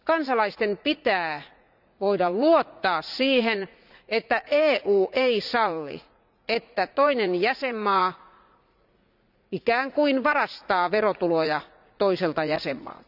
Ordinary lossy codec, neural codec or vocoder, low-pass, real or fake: none; vocoder, 44.1 kHz, 80 mel bands, Vocos; 5.4 kHz; fake